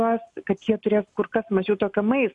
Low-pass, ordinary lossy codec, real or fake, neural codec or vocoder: 10.8 kHz; MP3, 96 kbps; real; none